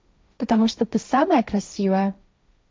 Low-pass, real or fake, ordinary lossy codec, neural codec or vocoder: none; fake; none; codec, 16 kHz, 1.1 kbps, Voila-Tokenizer